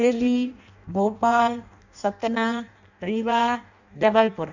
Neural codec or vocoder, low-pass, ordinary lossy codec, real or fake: codec, 16 kHz in and 24 kHz out, 0.6 kbps, FireRedTTS-2 codec; 7.2 kHz; none; fake